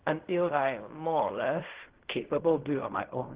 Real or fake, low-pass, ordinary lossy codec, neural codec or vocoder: fake; 3.6 kHz; Opus, 16 kbps; codec, 16 kHz in and 24 kHz out, 0.4 kbps, LongCat-Audio-Codec, fine tuned four codebook decoder